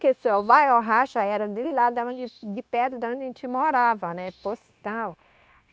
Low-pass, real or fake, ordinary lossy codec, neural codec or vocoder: none; fake; none; codec, 16 kHz, 0.9 kbps, LongCat-Audio-Codec